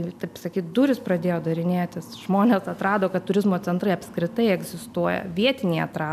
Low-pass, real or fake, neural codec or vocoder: 14.4 kHz; real; none